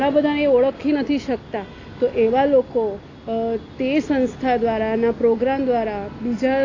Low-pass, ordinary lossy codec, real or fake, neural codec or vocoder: 7.2 kHz; AAC, 32 kbps; real; none